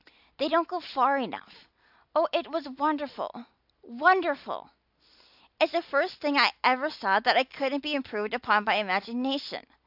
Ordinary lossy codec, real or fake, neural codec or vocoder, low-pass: AAC, 48 kbps; real; none; 5.4 kHz